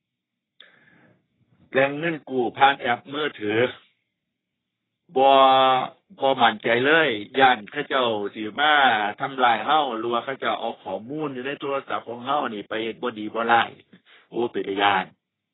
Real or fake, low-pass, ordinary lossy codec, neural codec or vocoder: fake; 7.2 kHz; AAC, 16 kbps; codec, 44.1 kHz, 3.4 kbps, Pupu-Codec